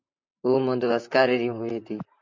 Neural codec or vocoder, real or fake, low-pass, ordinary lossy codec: vocoder, 44.1 kHz, 128 mel bands, Pupu-Vocoder; fake; 7.2 kHz; MP3, 48 kbps